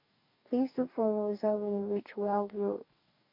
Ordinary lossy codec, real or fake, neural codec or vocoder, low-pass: MP3, 48 kbps; fake; codec, 44.1 kHz, 2.6 kbps, DAC; 5.4 kHz